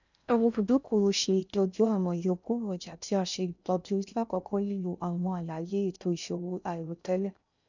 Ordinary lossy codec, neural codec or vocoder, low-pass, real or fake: none; codec, 16 kHz in and 24 kHz out, 0.6 kbps, FocalCodec, streaming, 4096 codes; 7.2 kHz; fake